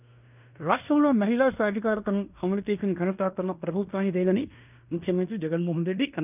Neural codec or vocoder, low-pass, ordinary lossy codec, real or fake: codec, 16 kHz in and 24 kHz out, 0.9 kbps, LongCat-Audio-Codec, fine tuned four codebook decoder; 3.6 kHz; none; fake